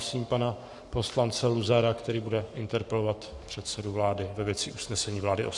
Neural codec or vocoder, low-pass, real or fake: codec, 44.1 kHz, 7.8 kbps, Pupu-Codec; 10.8 kHz; fake